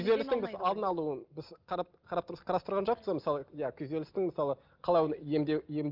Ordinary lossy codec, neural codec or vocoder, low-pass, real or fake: Opus, 24 kbps; none; 5.4 kHz; real